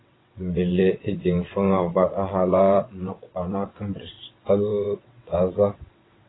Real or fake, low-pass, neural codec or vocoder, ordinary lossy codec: fake; 7.2 kHz; vocoder, 22.05 kHz, 80 mel bands, WaveNeXt; AAC, 16 kbps